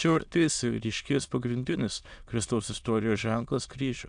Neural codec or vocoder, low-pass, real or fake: autoencoder, 22.05 kHz, a latent of 192 numbers a frame, VITS, trained on many speakers; 9.9 kHz; fake